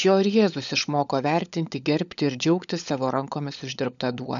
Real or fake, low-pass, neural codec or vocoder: fake; 7.2 kHz; codec, 16 kHz, 16 kbps, FunCodec, trained on LibriTTS, 50 frames a second